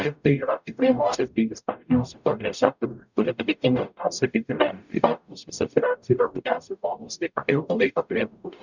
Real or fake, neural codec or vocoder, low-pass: fake; codec, 44.1 kHz, 0.9 kbps, DAC; 7.2 kHz